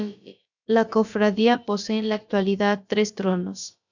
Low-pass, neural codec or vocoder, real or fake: 7.2 kHz; codec, 16 kHz, about 1 kbps, DyCAST, with the encoder's durations; fake